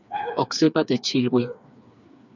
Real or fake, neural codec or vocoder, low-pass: fake; codec, 16 kHz, 4 kbps, FreqCodec, smaller model; 7.2 kHz